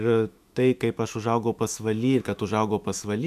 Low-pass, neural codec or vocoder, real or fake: 14.4 kHz; autoencoder, 48 kHz, 128 numbers a frame, DAC-VAE, trained on Japanese speech; fake